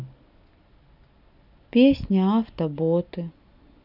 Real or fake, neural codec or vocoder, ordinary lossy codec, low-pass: real; none; none; 5.4 kHz